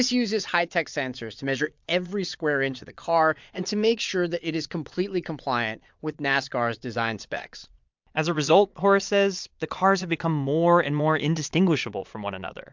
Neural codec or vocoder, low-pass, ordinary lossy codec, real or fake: vocoder, 22.05 kHz, 80 mel bands, Vocos; 7.2 kHz; MP3, 64 kbps; fake